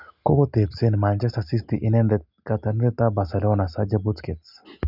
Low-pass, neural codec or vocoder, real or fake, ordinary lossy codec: 5.4 kHz; none; real; none